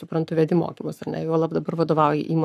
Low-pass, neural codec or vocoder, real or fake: 14.4 kHz; codec, 44.1 kHz, 7.8 kbps, Pupu-Codec; fake